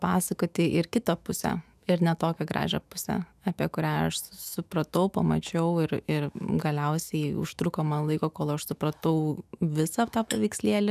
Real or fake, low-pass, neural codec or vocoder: fake; 14.4 kHz; autoencoder, 48 kHz, 128 numbers a frame, DAC-VAE, trained on Japanese speech